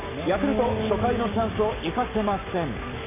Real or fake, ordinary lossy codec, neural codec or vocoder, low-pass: fake; MP3, 24 kbps; codec, 44.1 kHz, 7.8 kbps, Pupu-Codec; 3.6 kHz